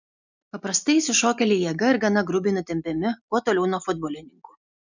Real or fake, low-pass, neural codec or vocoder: real; 7.2 kHz; none